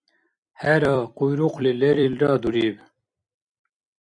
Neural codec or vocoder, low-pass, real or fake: vocoder, 44.1 kHz, 128 mel bands every 256 samples, BigVGAN v2; 9.9 kHz; fake